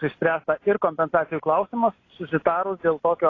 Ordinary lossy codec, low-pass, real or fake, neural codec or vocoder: AAC, 32 kbps; 7.2 kHz; real; none